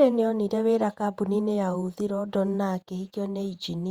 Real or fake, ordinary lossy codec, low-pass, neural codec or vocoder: fake; Opus, 64 kbps; 19.8 kHz; vocoder, 48 kHz, 128 mel bands, Vocos